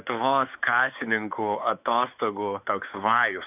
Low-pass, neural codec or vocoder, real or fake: 3.6 kHz; codec, 16 kHz, 4 kbps, X-Codec, WavLM features, trained on Multilingual LibriSpeech; fake